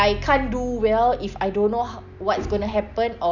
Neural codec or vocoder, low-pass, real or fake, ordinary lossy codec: none; 7.2 kHz; real; none